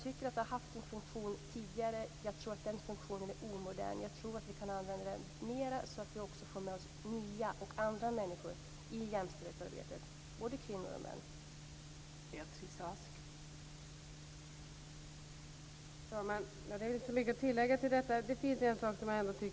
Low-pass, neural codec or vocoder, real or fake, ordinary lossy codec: none; none; real; none